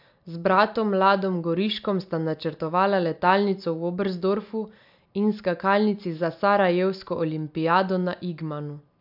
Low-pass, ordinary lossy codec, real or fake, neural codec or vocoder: 5.4 kHz; AAC, 48 kbps; real; none